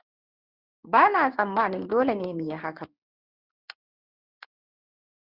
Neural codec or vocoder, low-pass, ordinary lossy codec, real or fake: codec, 16 kHz in and 24 kHz out, 1 kbps, XY-Tokenizer; 5.4 kHz; Opus, 64 kbps; fake